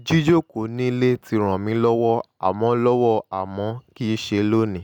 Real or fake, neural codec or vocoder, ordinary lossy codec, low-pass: real; none; none; 19.8 kHz